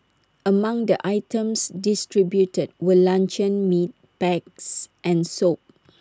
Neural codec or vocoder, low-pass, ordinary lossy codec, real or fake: none; none; none; real